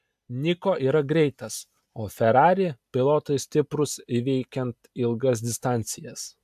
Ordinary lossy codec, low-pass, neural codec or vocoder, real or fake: AAC, 96 kbps; 14.4 kHz; none; real